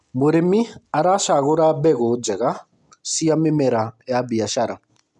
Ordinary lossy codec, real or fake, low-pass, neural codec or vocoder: none; real; 10.8 kHz; none